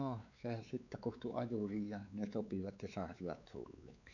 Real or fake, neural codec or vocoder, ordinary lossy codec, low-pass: fake; codec, 16 kHz, 4 kbps, X-Codec, WavLM features, trained on Multilingual LibriSpeech; none; 7.2 kHz